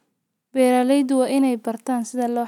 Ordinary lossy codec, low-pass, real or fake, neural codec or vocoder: none; 19.8 kHz; real; none